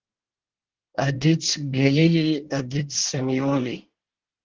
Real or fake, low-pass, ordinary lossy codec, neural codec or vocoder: fake; 7.2 kHz; Opus, 16 kbps; codec, 24 kHz, 1 kbps, SNAC